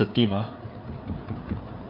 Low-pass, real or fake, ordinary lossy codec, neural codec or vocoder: 5.4 kHz; fake; none; codec, 16 kHz, 4 kbps, FreqCodec, larger model